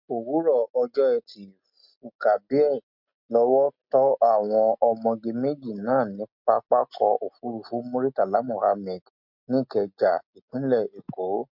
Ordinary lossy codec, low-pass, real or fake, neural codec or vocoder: MP3, 48 kbps; 5.4 kHz; real; none